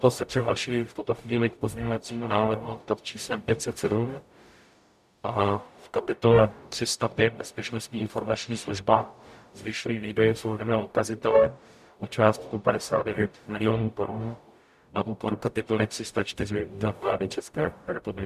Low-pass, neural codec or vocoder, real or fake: 14.4 kHz; codec, 44.1 kHz, 0.9 kbps, DAC; fake